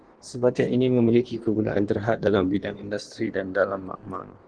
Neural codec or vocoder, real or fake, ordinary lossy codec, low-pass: codec, 16 kHz in and 24 kHz out, 1.1 kbps, FireRedTTS-2 codec; fake; Opus, 16 kbps; 9.9 kHz